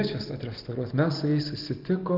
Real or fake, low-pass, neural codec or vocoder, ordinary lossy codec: real; 5.4 kHz; none; Opus, 24 kbps